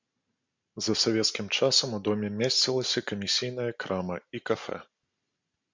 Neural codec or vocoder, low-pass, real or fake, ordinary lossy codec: none; 7.2 kHz; real; MP3, 64 kbps